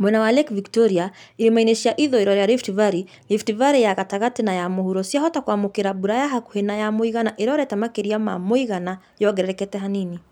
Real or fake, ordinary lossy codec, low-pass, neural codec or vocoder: real; none; 19.8 kHz; none